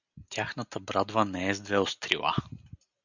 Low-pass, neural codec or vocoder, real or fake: 7.2 kHz; none; real